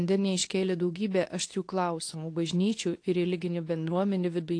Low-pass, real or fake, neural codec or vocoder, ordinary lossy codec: 9.9 kHz; fake; codec, 24 kHz, 0.9 kbps, WavTokenizer, medium speech release version 2; AAC, 48 kbps